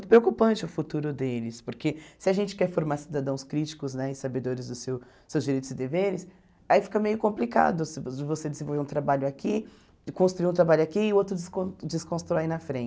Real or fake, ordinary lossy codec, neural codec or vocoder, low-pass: real; none; none; none